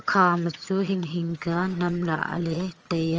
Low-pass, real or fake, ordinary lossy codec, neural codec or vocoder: 7.2 kHz; fake; Opus, 24 kbps; vocoder, 22.05 kHz, 80 mel bands, HiFi-GAN